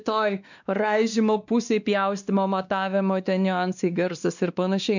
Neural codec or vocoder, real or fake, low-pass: codec, 16 kHz, 2 kbps, X-Codec, WavLM features, trained on Multilingual LibriSpeech; fake; 7.2 kHz